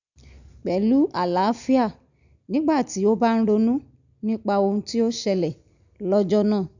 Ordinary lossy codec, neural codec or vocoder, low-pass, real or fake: none; none; 7.2 kHz; real